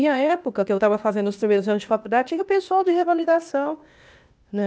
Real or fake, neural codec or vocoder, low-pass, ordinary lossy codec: fake; codec, 16 kHz, 0.8 kbps, ZipCodec; none; none